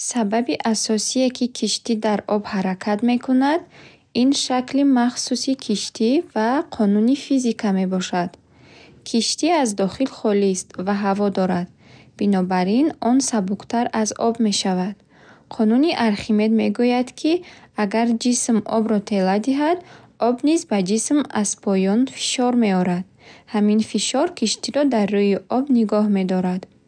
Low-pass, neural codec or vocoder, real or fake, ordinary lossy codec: 9.9 kHz; none; real; none